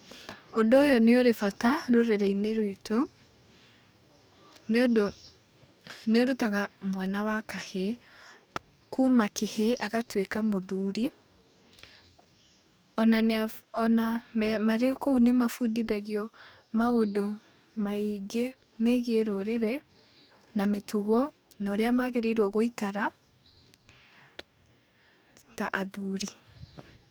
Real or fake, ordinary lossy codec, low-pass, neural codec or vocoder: fake; none; none; codec, 44.1 kHz, 2.6 kbps, DAC